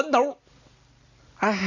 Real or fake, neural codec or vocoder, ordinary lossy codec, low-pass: real; none; AAC, 48 kbps; 7.2 kHz